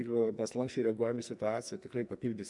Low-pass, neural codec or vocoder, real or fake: 10.8 kHz; codec, 44.1 kHz, 2.6 kbps, SNAC; fake